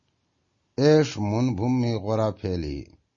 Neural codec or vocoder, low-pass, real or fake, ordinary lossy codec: none; 7.2 kHz; real; MP3, 32 kbps